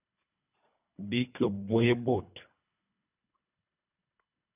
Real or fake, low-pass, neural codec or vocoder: fake; 3.6 kHz; codec, 24 kHz, 3 kbps, HILCodec